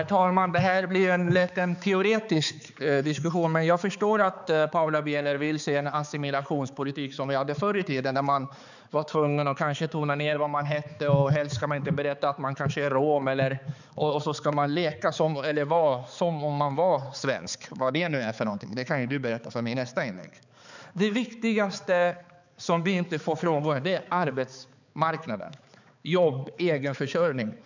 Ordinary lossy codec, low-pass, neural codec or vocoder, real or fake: none; 7.2 kHz; codec, 16 kHz, 4 kbps, X-Codec, HuBERT features, trained on balanced general audio; fake